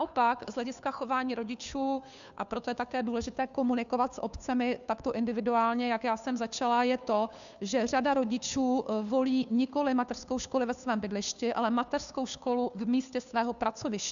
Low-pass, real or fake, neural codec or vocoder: 7.2 kHz; fake; codec, 16 kHz, 2 kbps, FunCodec, trained on Chinese and English, 25 frames a second